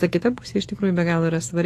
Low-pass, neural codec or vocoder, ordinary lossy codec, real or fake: 14.4 kHz; autoencoder, 48 kHz, 128 numbers a frame, DAC-VAE, trained on Japanese speech; AAC, 48 kbps; fake